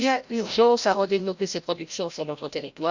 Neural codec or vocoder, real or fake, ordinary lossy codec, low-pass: codec, 16 kHz, 0.5 kbps, FreqCodec, larger model; fake; Opus, 64 kbps; 7.2 kHz